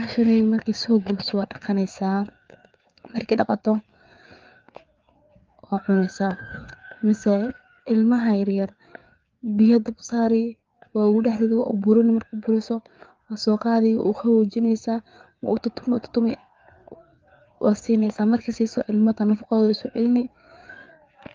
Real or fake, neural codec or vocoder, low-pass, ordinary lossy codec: fake; codec, 16 kHz, 4 kbps, FreqCodec, larger model; 7.2 kHz; Opus, 32 kbps